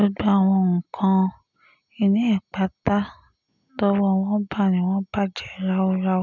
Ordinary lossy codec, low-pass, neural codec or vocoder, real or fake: none; 7.2 kHz; none; real